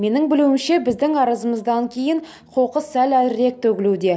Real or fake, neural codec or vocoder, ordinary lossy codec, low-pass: real; none; none; none